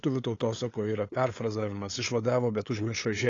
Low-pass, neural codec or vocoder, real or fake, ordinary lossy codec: 7.2 kHz; codec, 16 kHz, 8 kbps, FunCodec, trained on LibriTTS, 25 frames a second; fake; AAC, 32 kbps